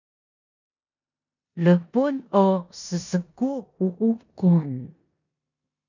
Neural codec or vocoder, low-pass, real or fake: codec, 16 kHz in and 24 kHz out, 0.9 kbps, LongCat-Audio-Codec, four codebook decoder; 7.2 kHz; fake